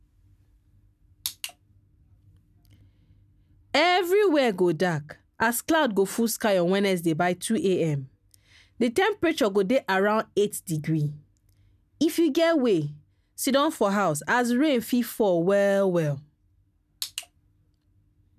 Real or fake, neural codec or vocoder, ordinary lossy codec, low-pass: real; none; none; 14.4 kHz